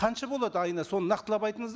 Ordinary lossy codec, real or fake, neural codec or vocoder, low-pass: none; real; none; none